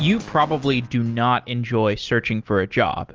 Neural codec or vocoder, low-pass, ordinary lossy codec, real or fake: none; 7.2 kHz; Opus, 24 kbps; real